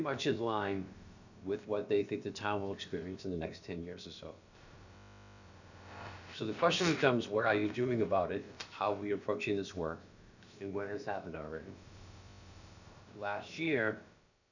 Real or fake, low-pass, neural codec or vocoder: fake; 7.2 kHz; codec, 16 kHz, about 1 kbps, DyCAST, with the encoder's durations